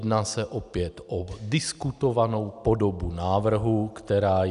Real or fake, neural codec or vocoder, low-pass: real; none; 10.8 kHz